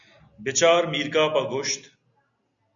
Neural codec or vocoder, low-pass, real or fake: none; 7.2 kHz; real